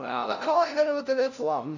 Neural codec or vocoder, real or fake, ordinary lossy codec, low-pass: codec, 16 kHz, 0.5 kbps, FunCodec, trained on LibriTTS, 25 frames a second; fake; none; 7.2 kHz